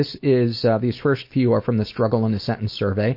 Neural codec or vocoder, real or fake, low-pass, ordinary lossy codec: none; real; 5.4 kHz; MP3, 32 kbps